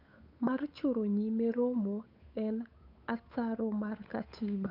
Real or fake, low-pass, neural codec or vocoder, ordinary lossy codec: fake; 5.4 kHz; codec, 16 kHz, 8 kbps, FunCodec, trained on Chinese and English, 25 frames a second; none